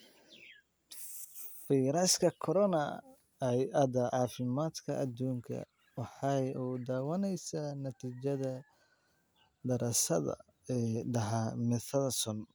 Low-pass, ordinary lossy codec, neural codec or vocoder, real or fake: none; none; none; real